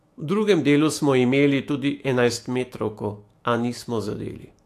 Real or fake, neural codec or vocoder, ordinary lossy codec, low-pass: fake; autoencoder, 48 kHz, 128 numbers a frame, DAC-VAE, trained on Japanese speech; AAC, 64 kbps; 14.4 kHz